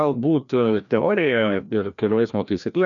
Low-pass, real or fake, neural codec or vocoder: 7.2 kHz; fake; codec, 16 kHz, 1 kbps, FreqCodec, larger model